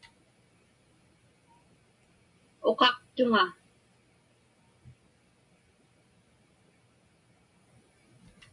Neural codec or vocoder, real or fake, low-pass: none; real; 10.8 kHz